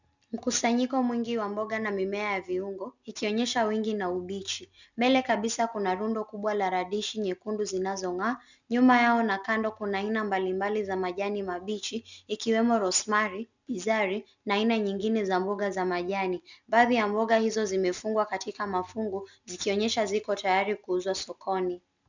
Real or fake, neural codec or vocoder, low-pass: real; none; 7.2 kHz